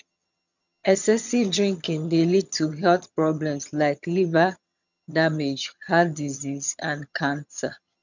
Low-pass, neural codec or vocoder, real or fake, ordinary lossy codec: 7.2 kHz; vocoder, 22.05 kHz, 80 mel bands, HiFi-GAN; fake; none